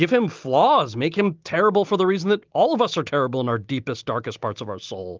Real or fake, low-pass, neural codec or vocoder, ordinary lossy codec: real; 7.2 kHz; none; Opus, 32 kbps